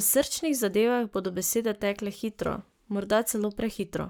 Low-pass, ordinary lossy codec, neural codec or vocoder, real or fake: none; none; codec, 44.1 kHz, 7.8 kbps, Pupu-Codec; fake